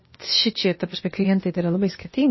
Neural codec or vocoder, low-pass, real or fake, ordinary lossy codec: codec, 16 kHz, 0.8 kbps, ZipCodec; 7.2 kHz; fake; MP3, 24 kbps